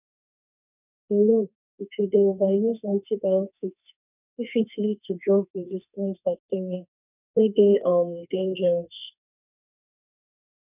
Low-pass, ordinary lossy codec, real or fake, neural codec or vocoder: 3.6 kHz; none; fake; codec, 32 kHz, 1.9 kbps, SNAC